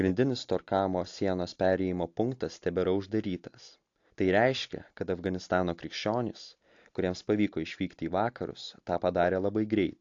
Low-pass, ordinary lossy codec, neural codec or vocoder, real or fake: 7.2 kHz; AAC, 48 kbps; none; real